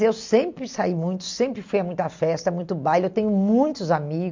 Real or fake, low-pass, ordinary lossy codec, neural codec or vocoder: real; 7.2 kHz; MP3, 64 kbps; none